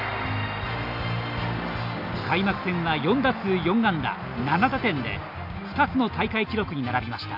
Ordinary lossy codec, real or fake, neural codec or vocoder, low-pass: none; real; none; 5.4 kHz